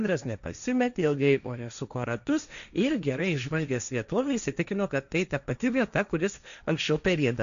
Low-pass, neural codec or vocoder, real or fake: 7.2 kHz; codec, 16 kHz, 1.1 kbps, Voila-Tokenizer; fake